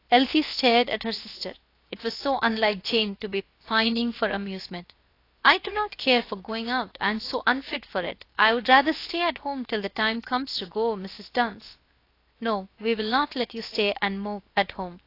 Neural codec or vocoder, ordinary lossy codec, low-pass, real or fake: codec, 16 kHz, about 1 kbps, DyCAST, with the encoder's durations; AAC, 32 kbps; 5.4 kHz; fake